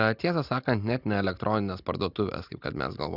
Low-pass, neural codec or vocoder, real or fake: 5.4 kHz; none; real